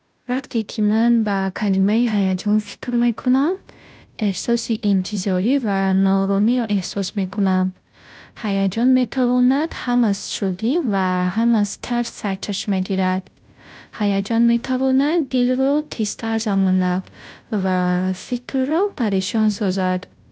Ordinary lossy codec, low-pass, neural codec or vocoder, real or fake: none; none; codec, 16 kHz, 0.5 kbps, FunCodec, trained on Chinese and English, 25 frames a second; fake